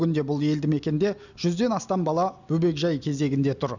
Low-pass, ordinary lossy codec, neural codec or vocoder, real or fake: 7.2 kHz; none; none; real